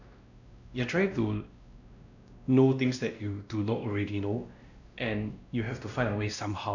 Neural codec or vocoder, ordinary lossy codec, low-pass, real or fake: codec, 16 kHz, 1 kbps, X-Codec, WavLM features, trained on Multilingual LibriSpeech; none; 7.2 kHz; fake